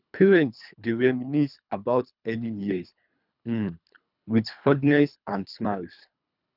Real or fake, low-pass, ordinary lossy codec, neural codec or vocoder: fake; 5.4 kHz; none; codec, 24 kHz, 3 kbps, HILCodec